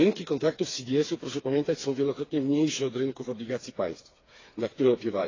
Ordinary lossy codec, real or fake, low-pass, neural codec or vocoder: AAC, 32 kbps; fake; 7.2 kHz; codec, 16 kHz, 4 kbps, FreqCodec, smaller model